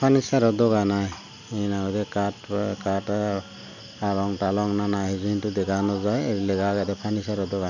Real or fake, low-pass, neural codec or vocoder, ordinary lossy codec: real; 7.2 kHz; none; none